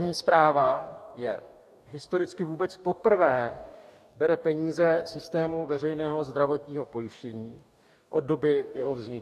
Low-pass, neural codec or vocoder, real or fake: 14.4 kHz; codec, 44.1 kHz, 2.6 kbps, DAC; fake